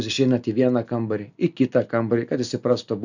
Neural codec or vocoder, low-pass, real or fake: none; 7.2 kHz; real